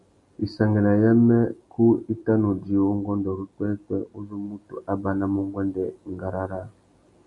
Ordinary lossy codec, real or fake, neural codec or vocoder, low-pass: MP3, 48 kbps; real; none; 10.8 kHz